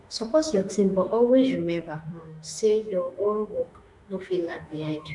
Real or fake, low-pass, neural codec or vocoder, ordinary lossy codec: fake; 10.8 kHz; autoencoder, 48 kHz, 32 numbers a frame, DAC-VAE, trained on Japanese speech; none